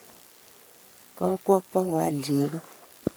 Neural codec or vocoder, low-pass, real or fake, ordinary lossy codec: codec, 44.1 kHz, 3.4 kbps, Pupu-Codec; none; fake; none